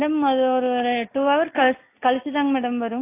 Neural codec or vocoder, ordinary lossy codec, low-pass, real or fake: none; AAC, 24 kbps; 3.6 kHz; real